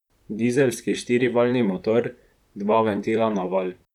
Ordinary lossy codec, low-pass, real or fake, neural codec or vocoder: none; 19.8 kHz; fake; vocoder, 44.1 kHz, 128 mel bands, Pupu-Vocoder